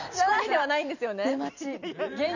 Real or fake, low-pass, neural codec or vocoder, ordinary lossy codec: fake; 7.2 kHz; vocoder, 44.1 kHz, 128 mel bands every 256 samples, BigVGAN v2; none